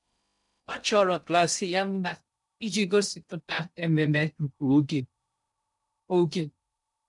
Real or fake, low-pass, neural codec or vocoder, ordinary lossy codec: fake; 10.8 kHz; codec, 16 kHz in and 24 kHz out, 0.6 kbps, FocalCodec, streaming, 2048 codes; none